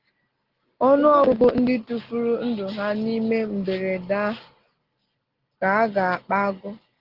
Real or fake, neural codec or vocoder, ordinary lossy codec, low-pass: real; none; Opus, 16 kbps; 5.4 kHz